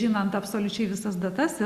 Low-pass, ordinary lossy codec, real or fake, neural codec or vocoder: 14.4 kHz; Opus, 64 kbps; real; none